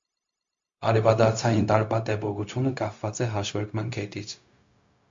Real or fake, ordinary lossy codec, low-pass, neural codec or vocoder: fake; MP3, 48 kbps; 7.2 kHz; codec, 16 kHz, 0.4 kbps, LongCat-Audio-Codec